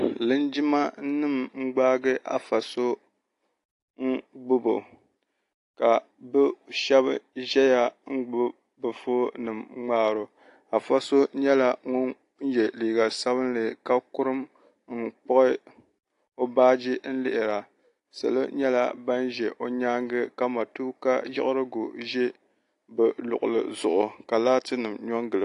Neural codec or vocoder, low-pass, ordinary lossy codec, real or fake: none; 10.8 kHz; AAC, 48 kbps; real